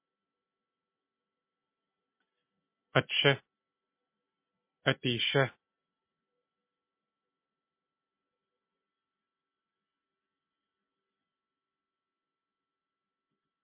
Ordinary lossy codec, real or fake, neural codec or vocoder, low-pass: MP3, 24 kbps; real; none; 3.6 kHz